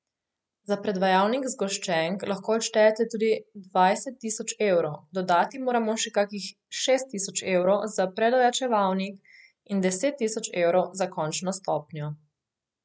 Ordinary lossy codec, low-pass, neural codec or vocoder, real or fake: none; none; none; real